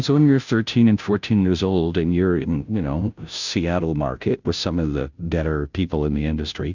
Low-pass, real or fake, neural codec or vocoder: 7.2 kHz; fake; codec, 16 kHz, 0.5 kbps, FunCodec, trained on Chinese and English, 25 frames a second